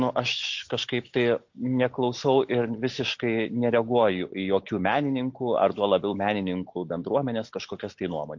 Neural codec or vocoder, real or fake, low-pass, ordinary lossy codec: none; real; 7.2 kHz; MP3, 48 kbps